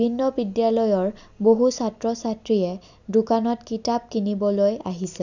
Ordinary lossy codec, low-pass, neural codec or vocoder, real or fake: none; 7.2 kHz; none; real